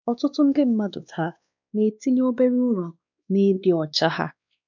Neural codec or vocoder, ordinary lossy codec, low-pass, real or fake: codec, 16 kHz, 2 kbps, X-Codec, WavLM features, trained on Multilingual LibriSpeech; none; 7.2 kHz; fake